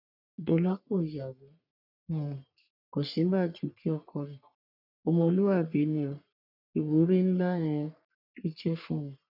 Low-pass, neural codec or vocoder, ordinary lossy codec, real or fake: 5.4 kHz; codec, 44.1 kHz, 3.4 kbps, Pupu-Codec; none; fake